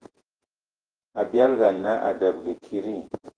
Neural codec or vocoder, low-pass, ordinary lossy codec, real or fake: vocoder, 48 kHz, 128 mel bands, Vocos; 9.9 kHz; Opus, 16 kbps; fake